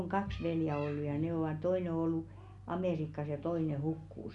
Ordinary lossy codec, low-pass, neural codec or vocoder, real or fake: none; 10.8 kHz; none; real